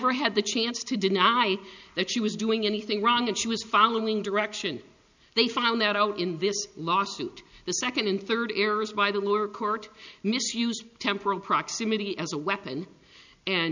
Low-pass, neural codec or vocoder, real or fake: 7.2 kHz; none; real